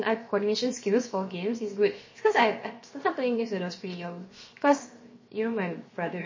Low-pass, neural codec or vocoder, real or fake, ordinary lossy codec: 7.2 kHz; codec, 16 kHz, 0.7 kbps, FocalCodec; fake; MP3, 32 kbps